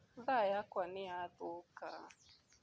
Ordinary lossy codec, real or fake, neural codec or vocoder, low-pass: none; real; none; none